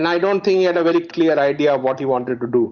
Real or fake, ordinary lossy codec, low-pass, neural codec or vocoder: real; Opus, 64 kbps; 7.2 kHz; none